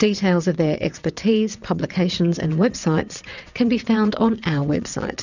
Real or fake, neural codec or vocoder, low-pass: fake; vocoder, 22.05 kHz, 80 mel bands, WaveNeXt; 7.2 kHz